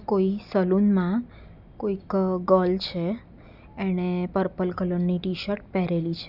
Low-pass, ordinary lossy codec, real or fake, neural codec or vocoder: 5.4 kHz; none; real; none